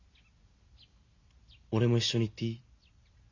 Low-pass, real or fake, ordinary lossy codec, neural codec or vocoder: 7.2 kHz; real; none; none